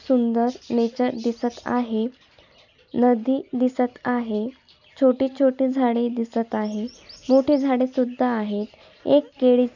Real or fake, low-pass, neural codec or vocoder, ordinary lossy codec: real; 7.2 kHz; none; none